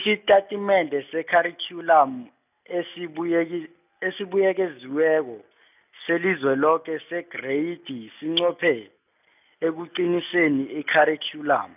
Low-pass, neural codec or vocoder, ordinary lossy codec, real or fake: 3.6 kHz; none; none; real